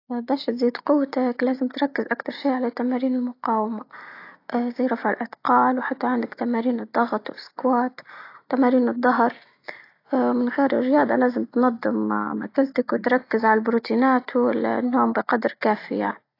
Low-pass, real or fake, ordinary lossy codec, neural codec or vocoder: 5.4 kHz; real; AAC, 32 kbps; none